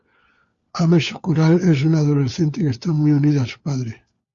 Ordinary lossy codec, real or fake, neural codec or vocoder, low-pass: Opus, 64 kbps; fake; codec, 16 kHz, 4 kbps, FunCodec, trained on LibriTTS, 50 frames a second; 7.2 kHz